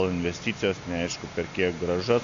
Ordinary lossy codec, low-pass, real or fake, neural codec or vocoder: AAC, 64 kbps; 7.2 kHz; real; none